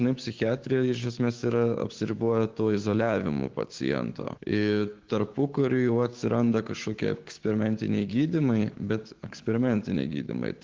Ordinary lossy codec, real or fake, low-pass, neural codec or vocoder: Opus, 16 kbps; fake; 7.2 kHz; codec, 16 kHz, 6 kbps, DAC